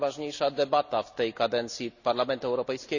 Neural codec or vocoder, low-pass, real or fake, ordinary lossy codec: none; 7.2 kHz; real; none